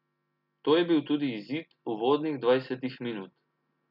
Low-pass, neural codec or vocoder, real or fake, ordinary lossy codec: 5.4 kHz; none; real; none